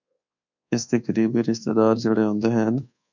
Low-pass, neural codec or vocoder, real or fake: 7.2 kHz; codec, 24 kHz, 1.2 kbps, DualCodec; fake